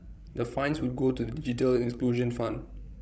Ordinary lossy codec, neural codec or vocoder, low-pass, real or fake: none; codec, 16 kHz, 16 kbps, FreqCodec, larger model; none; fake